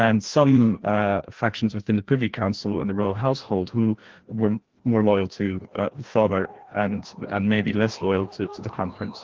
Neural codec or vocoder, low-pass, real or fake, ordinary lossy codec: codec, 16 kHz, 1 kbps, FreqCodec, larger model; 7.2 kHz; fake; Opus, 16 kbps